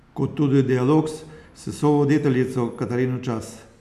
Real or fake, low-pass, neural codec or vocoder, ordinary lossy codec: real; 14.4 kHz; none; none